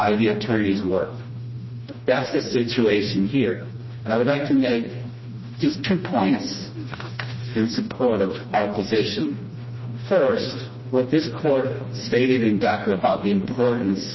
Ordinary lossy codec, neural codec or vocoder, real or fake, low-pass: MP3, 24 kbps; codec, 16 kHz, 1 kbps, FreqCodec, smaller model; fake; 7.2 kHz